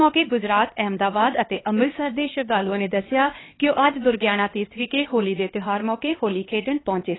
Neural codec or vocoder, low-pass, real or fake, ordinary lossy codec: codec, 16 kHz, 2 kbps, X-Codec, HuBERT features, trained on LibriSpeech; 7.2 kHz; fake; AAC, 16 kbps